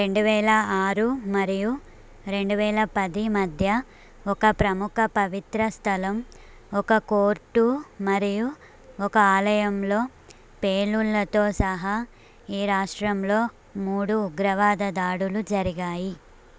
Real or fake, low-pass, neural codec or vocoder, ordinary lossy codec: real; none; none; none